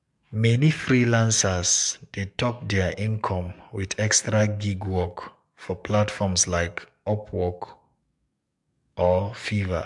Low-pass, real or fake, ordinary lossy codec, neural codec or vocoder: 10.8 kHz; fake; MP3, 64 kbps; codec, 44.1 kHz, 7.8 kbps, DAC